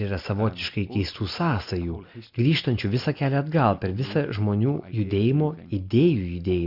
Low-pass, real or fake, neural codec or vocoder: 5.4 kHz; real; none